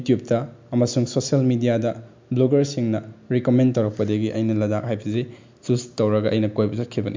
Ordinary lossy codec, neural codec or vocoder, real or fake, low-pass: MP3, 64 kbps; none; real; 7.2 kHz